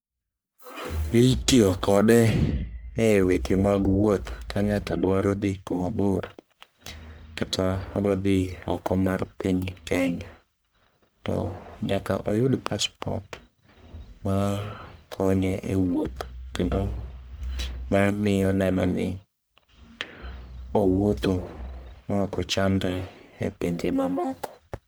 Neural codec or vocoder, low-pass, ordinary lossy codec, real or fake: codec, 44.1 kHz, 1.7 kbps, Pupu-Codec; none; none; fake